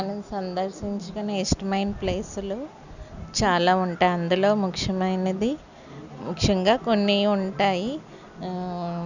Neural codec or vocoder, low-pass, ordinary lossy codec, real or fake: none; 7.2 kHz; none; real